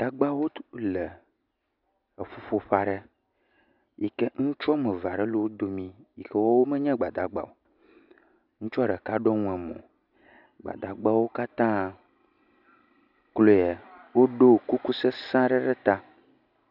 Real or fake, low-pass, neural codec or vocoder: real; 5.4 kHz; none